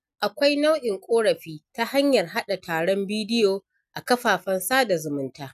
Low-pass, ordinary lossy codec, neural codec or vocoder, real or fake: 14.4 kHz; none; none; real